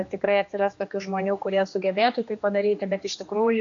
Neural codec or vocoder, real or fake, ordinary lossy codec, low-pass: codec, 16 kHz, 2 kbps, X-Codec, HuBERT features, trained on general audio; fake; AAC, 48 kbps; 7.2 kHz